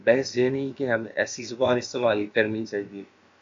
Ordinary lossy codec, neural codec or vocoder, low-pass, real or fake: MP3, 48 kbps; codec, 16 kHz, about 1 kbps, DyCAST, with the encoder's durations; 7.2 kHz; fake